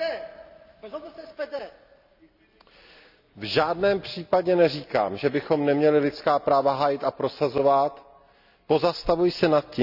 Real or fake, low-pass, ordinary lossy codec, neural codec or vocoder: real; 5.4 kHz; none; none